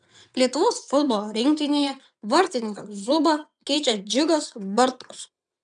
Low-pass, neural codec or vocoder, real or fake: 9.9 kHz; vocoder, 22.05 kHz, 80 mel bands, WaveNeXt; fake